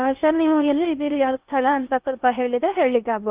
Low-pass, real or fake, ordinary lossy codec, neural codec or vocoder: 3.6 kHz; fake; Opus, 32 kbps; codec, 16 kHz in and 24 kHz out, 0.8 kbps, FocalCodec, streaming, 65536 codes